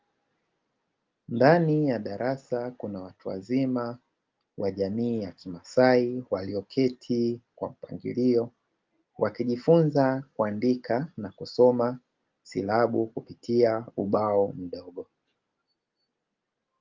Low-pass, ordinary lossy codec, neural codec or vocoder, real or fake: 7.2 kHz; Opus, 32 kbps; none; real